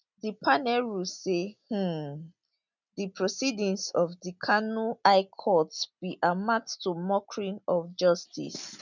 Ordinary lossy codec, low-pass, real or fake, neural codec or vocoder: none; 7.2 kHz; real; none